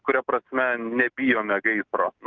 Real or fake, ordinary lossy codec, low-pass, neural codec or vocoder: real; Opus, 24 kbps; 7.2 kHz; none